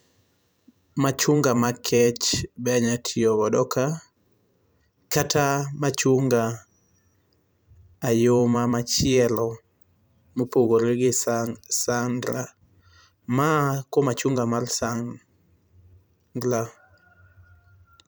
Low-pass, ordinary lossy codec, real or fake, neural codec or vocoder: none; none; fake; vocoder, 44.1 kHz, 128 mel bands every 512 samples, BigVGAN v2